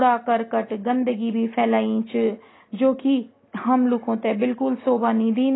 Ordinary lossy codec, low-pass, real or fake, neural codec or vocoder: AAC, 16 kbps; 7.2 kHz; real; none